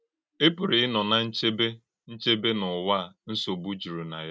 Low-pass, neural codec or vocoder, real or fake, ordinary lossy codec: none; none; real; none